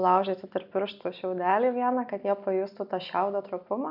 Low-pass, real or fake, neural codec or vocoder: 5.4 kHz; real; none